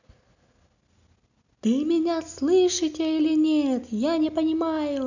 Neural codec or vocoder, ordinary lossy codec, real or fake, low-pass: none; none; real; 7.2 kHz